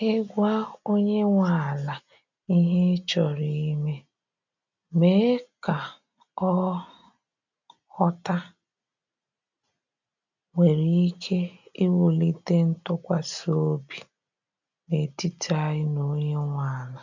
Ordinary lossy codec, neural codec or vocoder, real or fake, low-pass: none; none; real; 7.2 kHz